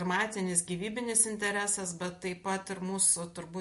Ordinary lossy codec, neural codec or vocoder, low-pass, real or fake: MP3, 48 kbps; none; 14.4 kHz; real